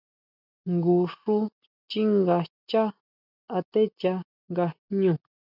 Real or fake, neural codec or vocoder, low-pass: real; none; 5.4 kHz